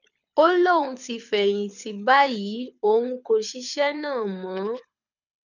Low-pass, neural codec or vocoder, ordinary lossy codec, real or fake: 7.2 kHz; codec, 24 kHz, 6 kbps, HILCodec; none; fake